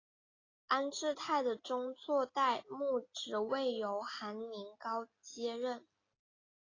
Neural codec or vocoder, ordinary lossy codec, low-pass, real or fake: none; AAC, 32 kbps; 7.2 kHz; real